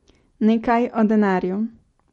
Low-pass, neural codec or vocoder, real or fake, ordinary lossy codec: 19.8 kHz; none; real; MP3, 48 kbps